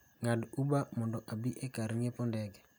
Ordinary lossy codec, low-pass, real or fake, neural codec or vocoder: none; none; real; none